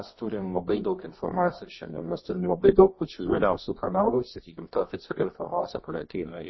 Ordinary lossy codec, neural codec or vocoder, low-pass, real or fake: MP3, 24 kbps; codec, 24 kHz, 0.9 kbps, WavTokenizer, medium music audio release; 7.2 kHz; fake